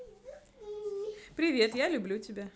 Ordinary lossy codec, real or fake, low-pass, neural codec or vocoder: none; real; none; none